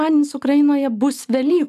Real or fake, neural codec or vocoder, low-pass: real; none; 14.4 kHz